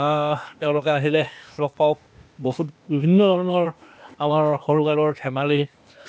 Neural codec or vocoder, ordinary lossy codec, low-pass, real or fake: codec, 16 kHz, 0.8 kbps, ZipCodec; none; none; fake